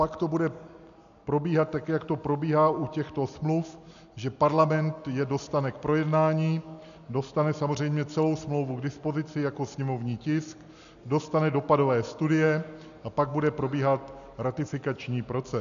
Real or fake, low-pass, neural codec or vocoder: real; 7.2 kHz; none